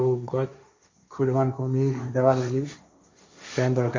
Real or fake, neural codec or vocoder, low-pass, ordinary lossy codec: fake; codec, 16 kHz, 1.1 kbps, Voila-Tokenizer; 7.2 kHz; MP3, 64 kbps